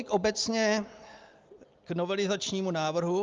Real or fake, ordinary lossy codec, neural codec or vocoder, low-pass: real; Opus, 32 kbps; none; 7.2 kHz